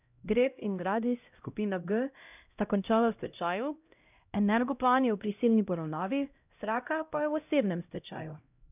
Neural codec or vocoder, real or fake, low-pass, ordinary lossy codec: codec, 16 kHz, 0.5 kbps, X-Codec, HuBERT features, trained on LibriSpeech; fake; 3.6 kHz; none